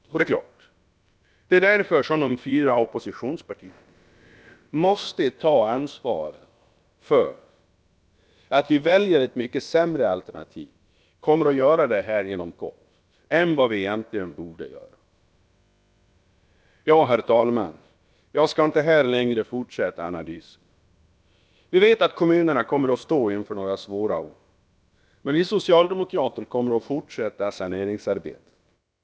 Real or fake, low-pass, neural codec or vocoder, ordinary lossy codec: fake; none; codec, 16 kHz, about 1 kbps, DyCAST, with the encoder's durations; none